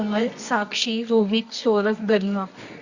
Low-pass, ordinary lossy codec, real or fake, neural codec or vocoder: 7.2 kHz; Opus, 64 kbps; fake; codec, 24 kHz, 0.9 kbps, WavTokenizer, medium music audio release